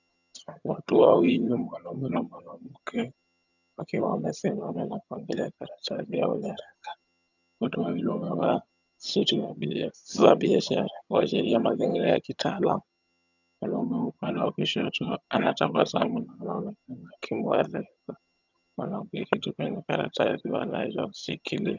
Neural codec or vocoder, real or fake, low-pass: vocoder, 22.05 kHz, 80 mel bands, HiFi-GAN; fake; 7.2 kHz